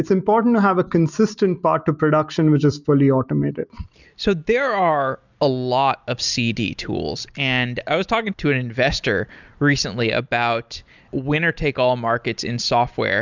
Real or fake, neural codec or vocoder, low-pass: real; none; 7.2 kHz